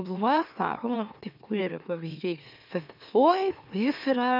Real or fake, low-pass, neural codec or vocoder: fake; 5.4 kHz; autoencoder, 44.1 kHz, a latent of 192 numbers a frame, MeloTTS